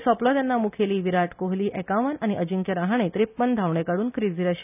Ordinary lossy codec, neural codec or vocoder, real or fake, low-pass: none; none; real; 3.6 kHz